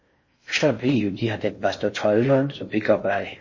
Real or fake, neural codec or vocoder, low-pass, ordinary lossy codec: fake; codec, 16 kHz in and 24 kHz out, 0.6 kbps, FocalCodec, streaming, 4096 codes; 7.2 kHz; MP3, 32 kbps